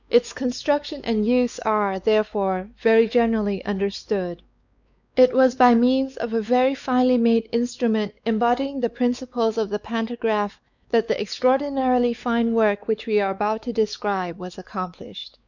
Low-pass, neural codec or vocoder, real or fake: 7.2 kHz; codec, 16 kHz, 4 kbps, X-Codec, WavLM features, trained on Multilingual LibriSpeech; fake